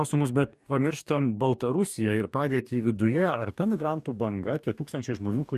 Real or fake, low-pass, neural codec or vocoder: fake; 14.4 kHz; codec, 44.1 kHz, 2.6 kbps, DAC